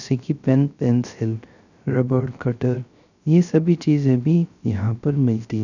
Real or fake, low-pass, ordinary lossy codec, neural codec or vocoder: fake; 7.2 kHz; none; codec, 16 kHz, 0.3 kbps, FocalCodec